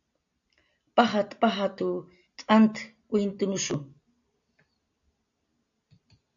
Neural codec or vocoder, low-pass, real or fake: none; 7.2 kHz; real